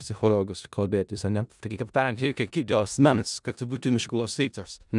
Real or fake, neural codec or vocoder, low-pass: fake; codec, 16 kHz in and 24 kHz out, 0.4 kbps, LongCat-Audio-Codec, four codebook decoder; 10.8 kHz